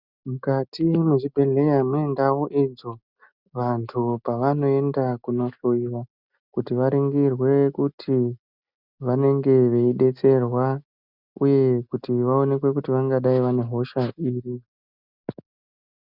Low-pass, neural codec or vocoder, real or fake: 5.4 kHz; none; real